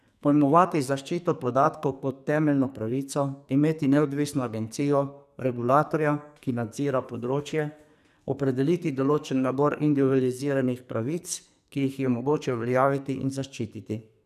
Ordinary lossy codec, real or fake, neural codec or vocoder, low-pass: none; fake; codec, 44.1 kHz, 2.6 kbps, SNAC; 14.4 kHz